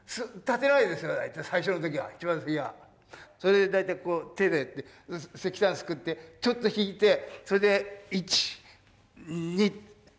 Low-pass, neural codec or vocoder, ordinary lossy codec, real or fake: none; none; none; real